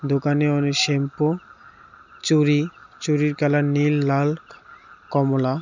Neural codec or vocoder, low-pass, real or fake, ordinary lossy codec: none; 7.2 kHz; real; none